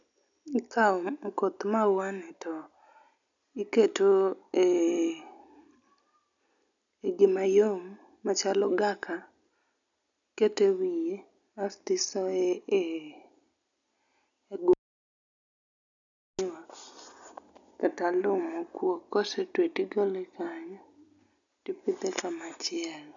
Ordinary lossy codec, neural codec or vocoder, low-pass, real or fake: none; none; 7.2 kHz; real